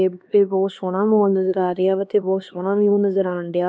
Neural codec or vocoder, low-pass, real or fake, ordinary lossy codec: codec, 16 kHz, 2 kbps, X-Codec, HuBERT features, trained on LibriSpeech; none; fake; none